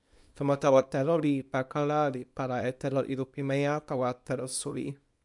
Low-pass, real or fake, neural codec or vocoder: 10.8 kHz; fake; codec, 24 kHz, 0.9 kbps, WavTokenizer, small release